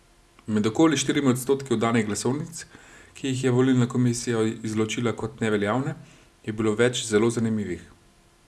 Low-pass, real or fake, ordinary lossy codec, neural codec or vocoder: none; real; none; none